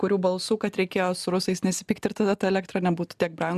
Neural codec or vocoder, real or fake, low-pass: none; real; 14.4 kHz